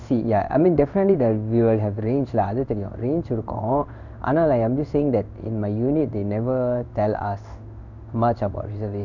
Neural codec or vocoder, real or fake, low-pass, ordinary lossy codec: codec, 16 kHz in and 24 kHz out, 1 kbps, XY-Tokenizer; fake; 7.2 kHz; none